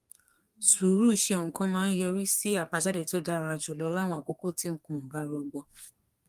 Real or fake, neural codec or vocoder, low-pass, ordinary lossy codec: fake; codec, 32 kHz, 1.9 kbps, SNAC; 14.4 kHz; Opus, 32 kbps